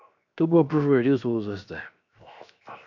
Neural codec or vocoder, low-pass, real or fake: codec, 16 kHz, 0.7 kbps, FocalCodec; 7.2 kHz; fake